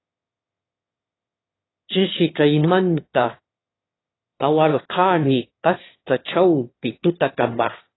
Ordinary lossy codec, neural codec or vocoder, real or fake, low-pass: AAC, 16 kbps; autoencoder, 22.05 kHz, a latent of 192 numbers a frame, VITS, trained on one speaker; fake; 7.2 kHz